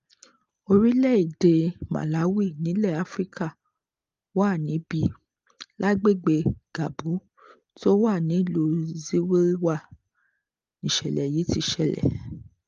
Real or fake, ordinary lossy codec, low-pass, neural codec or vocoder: real; Opus, 32 kbps; 7.2 kHz; none